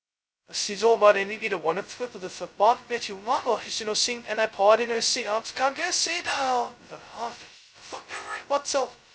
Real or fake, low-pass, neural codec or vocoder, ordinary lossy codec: fake; none; codec, 16 kHz, 0.2 kbps, FocalCodec; none